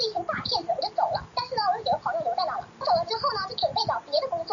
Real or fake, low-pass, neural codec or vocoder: real; 7.2 kHz; none